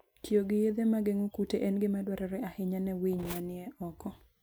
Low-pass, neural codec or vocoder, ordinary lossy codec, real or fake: none; none; none; real